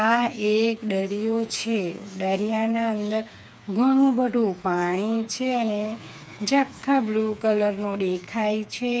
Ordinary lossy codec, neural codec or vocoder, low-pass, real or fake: none; codec, 16 kHz, 4 kbps, FreqCodec, smaller model; none; fake